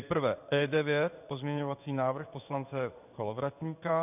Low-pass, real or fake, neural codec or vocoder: 3.6 kHz; fake; codec, 16 kHz in and 24 kHz out, 1 kbps, XY-Tokenizer